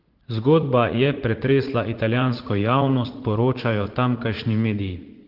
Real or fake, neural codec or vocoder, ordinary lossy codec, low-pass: fake; vocoder, 44.1 kHz, 80 mel bands, Vocos; Opus, 16 kbps; 5.4 kHz